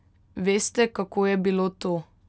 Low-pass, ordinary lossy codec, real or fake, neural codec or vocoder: none; none; real; none